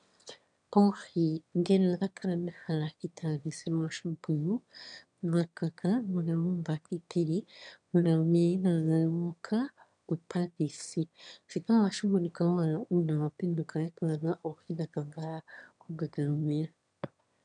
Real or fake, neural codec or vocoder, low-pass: fake; autoencoder, 22.05 kHz, a latent of 192 numbers a frame, VITS, trained on one speaker; 9.9 kHz